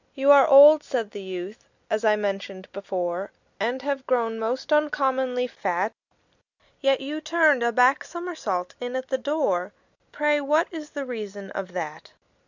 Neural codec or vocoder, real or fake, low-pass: none; real; 7.2 kHz